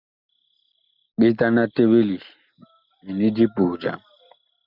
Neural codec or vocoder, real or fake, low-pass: none; real; 5.4 kHz